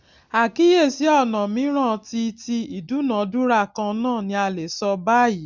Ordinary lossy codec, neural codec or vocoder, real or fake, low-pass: none; none; real; 7.2 kHz